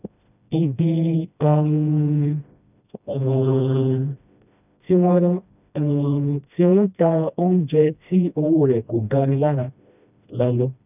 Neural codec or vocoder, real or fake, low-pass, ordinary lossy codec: codec, 16 kHz, 1 kbps, FreqCodec, smaller model; fake; 3.6 kHz; none